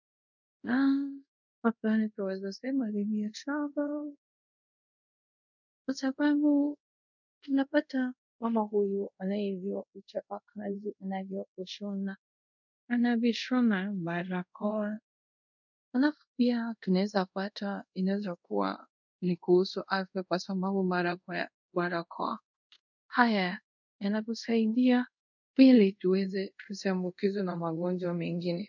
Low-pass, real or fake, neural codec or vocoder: 7.2 kHz; fake; codec, 24 kHz, 0.5 kbps, DualCodec